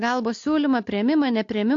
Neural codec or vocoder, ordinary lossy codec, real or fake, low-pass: none; AAC, 48 kbps; real; 7.2 kHz